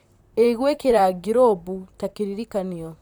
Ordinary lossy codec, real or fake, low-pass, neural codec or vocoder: none; fake; 19.8 kHz; vocoder, 44.1 kHz, 128 mel bands, Pupu-Vocoder